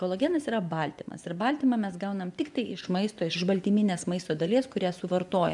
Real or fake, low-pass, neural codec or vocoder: fake; 10.8 kHz; vocoder, 24 kHz, 100 mel bands, Vocos